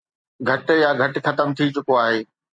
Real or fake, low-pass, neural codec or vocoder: fake; 9.9 kHz; vocoder, 44.1 kHz, 128 mel bands every 256 samples, BigVGAN v2